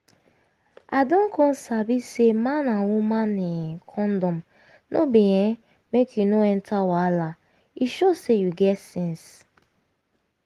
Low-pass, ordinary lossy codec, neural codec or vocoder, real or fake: 14.4 kHz; Opus, 24 kbps; none; real